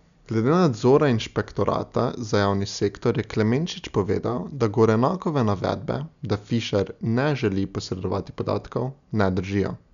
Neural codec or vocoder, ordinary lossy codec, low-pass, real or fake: none; none; 7.2 kHz; real